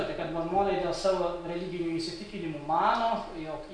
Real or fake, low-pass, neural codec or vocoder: real; 9.9 kHz; none